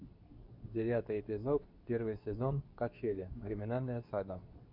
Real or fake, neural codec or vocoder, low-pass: fake; codec, 24 kHz, 0.9 kbps, WavTokenizer, medium speech release version 2; 5.4 kHz